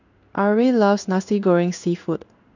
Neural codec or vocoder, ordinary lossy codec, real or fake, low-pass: codec, 16 kHz in and 24 kHz out, 1 kbps, XY-Tokenizer; none; fake; 7.2 kHz